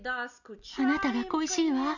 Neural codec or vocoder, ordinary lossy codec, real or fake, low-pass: none; none; real; 7.2 kHz